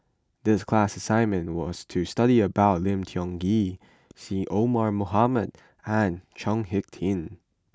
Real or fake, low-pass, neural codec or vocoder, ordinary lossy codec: real; none; none; none